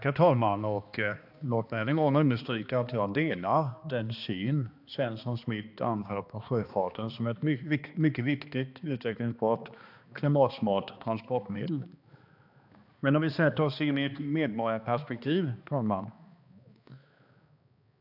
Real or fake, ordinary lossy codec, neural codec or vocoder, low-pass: fake; AAC, 48 kbps; codec, 16 kHz, 2 kbps, X-Codec, HuBERT features, trained on balanced general audio; 5.4 kHz